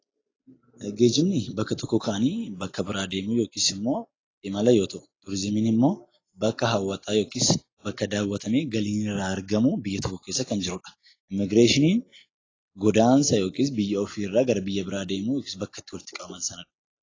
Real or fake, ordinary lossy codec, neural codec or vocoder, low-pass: real; AAC, 32 kbps; none; 7.2 kHz